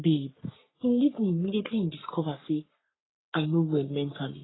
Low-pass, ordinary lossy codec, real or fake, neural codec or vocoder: 7.2 kHz; AAC, 16 kbps; fake; codec, 44.1 kHz, 3.4 kbps, Pupu-Codec